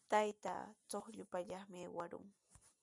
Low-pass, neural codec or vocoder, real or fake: 10.8 kHz; none; real